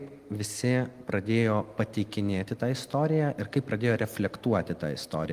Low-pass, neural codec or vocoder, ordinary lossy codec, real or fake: 14.4 kHz; none; Opus, 32 kbps; real